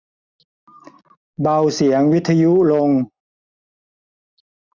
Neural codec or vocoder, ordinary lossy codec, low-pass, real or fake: none; none; 7.2 kHz; real